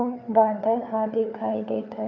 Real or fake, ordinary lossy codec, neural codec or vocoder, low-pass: fake; none; codec, 16 kHz, 4 kbps, FunCodec, trained on LibriTTS, 50 frames a second; 7.2 kHz